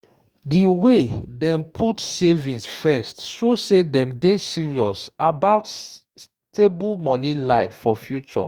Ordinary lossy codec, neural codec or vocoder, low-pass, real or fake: Opus, 64 kbps; codec, 44.1 kHz, 2.6 kbps, DAC; 19.8 kHz; fake